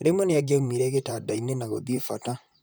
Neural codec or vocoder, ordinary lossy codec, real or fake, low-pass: vocoder, 44.1 kHz, 128 mel bands, Pupu-Vocoder; none; fake; none